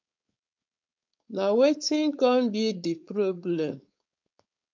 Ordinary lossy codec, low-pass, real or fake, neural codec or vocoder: MP3, 64 kbps; 7.2 kHz; fake; codec, 16 kHz, 4.8 kbps, FACodec